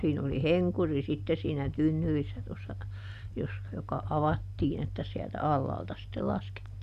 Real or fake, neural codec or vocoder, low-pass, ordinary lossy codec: real; none; 14.4 kHz; none